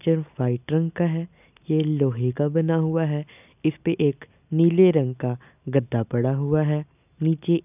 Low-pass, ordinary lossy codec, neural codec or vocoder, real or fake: 3.6 kHz; none; none; real